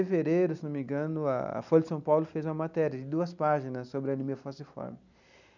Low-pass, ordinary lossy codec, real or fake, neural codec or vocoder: 7.2 kHz; none; real; none